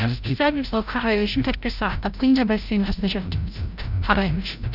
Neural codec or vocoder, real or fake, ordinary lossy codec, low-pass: codec, 16 kHz, 0.5 kbps, FreqCodec, larger model; fake; none; 5.4 kHz